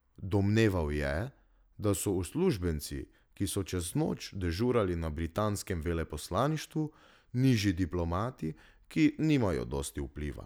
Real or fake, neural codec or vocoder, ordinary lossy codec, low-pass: real; none; none; none